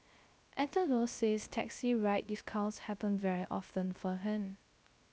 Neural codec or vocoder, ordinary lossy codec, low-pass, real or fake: codec, 16 kHz, 0.3 kbps, FocalCodec; none; none; fake